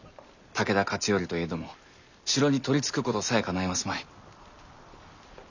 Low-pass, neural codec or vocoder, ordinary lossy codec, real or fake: 7.2 kHz; none; none; real